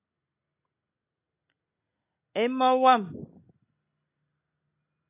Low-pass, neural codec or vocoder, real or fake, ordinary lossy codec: 3.6 kHz; none; real; AAC, 24 kbps